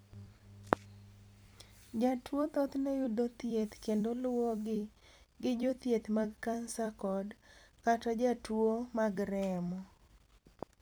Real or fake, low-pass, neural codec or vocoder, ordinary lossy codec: fake; none; vocoder, 44.1 kHz, 128 mel bands every 256 samples, BigVGAN v2; none